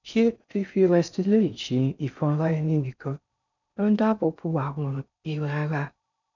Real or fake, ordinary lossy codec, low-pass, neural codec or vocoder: fake; none; 7.2 kHz; codec, 16 kHz in and 24 kHz out, 0.6 kbps, FocalCodec, streaming, 4096 codes